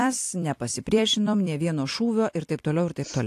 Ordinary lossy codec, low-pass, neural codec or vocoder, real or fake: AAC, 64 kbps; 14.4 kHz; vocoder, 44.1 kHz, 128 mel bands every 256 samples, BigVGAN v2; fake